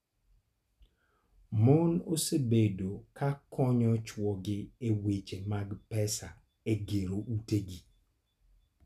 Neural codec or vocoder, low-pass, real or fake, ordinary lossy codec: none; 14.4 kHz; real; none